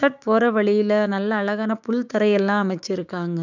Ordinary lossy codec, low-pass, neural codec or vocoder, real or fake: none; 7.2 kHz; codec, 16 kHz, 6 kbps, DAC; fake